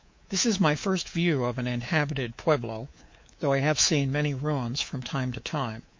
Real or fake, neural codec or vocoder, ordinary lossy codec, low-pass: fake; codec, 24 kHz, 3.1 kbps, DualCodec; MP3, 48 kbps; 7.2 kHz